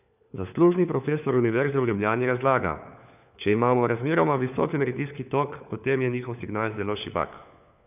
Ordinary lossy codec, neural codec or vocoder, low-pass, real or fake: none; codec, 16 kHz, 4 kbps, FunCodec, trained on LibriTTS, 50 frames a second; 3.6 kHz; fake